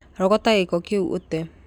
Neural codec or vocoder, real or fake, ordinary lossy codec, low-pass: none; real; none; 19.8 kHz